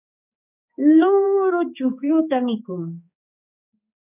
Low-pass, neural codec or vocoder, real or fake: 3.6 kHz; codec, 16 kHz, 4 kbps, X-Codec, HuBERT features, trained on general audio; fake